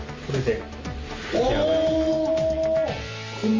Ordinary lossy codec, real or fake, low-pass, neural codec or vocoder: Opus, 32 kbps; real; 7.2 kHz; none